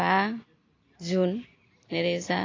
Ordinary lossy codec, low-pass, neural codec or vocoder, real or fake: AAC, 32 kbps; 7.2 kHz; none; real